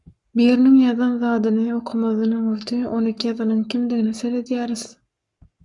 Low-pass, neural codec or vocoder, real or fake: 10.8 kHz; codec, 44.1 kHz, 7.8 kbps, Pupu-Codec; fake